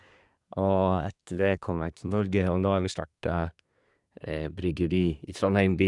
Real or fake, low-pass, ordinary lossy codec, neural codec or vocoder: fake; 10.8 kHz; none; codec, 24 kHz, 1 kbps, SNAC